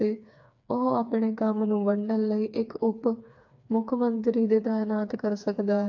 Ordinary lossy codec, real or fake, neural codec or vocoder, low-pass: AAC, 48 kbps; fake; codec, 16 kHz, 4 kbps, FreqCodec, smaller model; 7.2 kHz